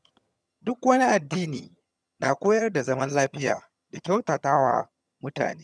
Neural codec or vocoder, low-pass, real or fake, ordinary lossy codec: vocoder, 22.05 kHz, 80 mel bands, HiFi-GAN; none; fake; none